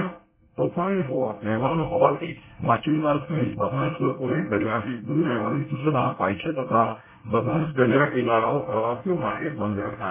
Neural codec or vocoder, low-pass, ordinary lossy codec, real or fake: codec, 24 kHz, 1 kbps, SNAC; 3.6 kHz; MP3, 16 kbps; fake